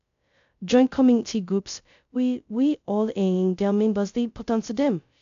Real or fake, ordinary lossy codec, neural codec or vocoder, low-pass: fake; AAC, 64 kbps; codec, 16 kHz, 0.2 kbps, FocalCodec; 7.2 kHz